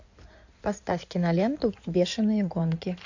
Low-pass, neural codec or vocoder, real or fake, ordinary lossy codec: 7.2 kHz; codec, 16 kHz in and 24 kHz out, 2.2 kbps, FireRedTTS-2 codec; fake; AAC, 48 kbps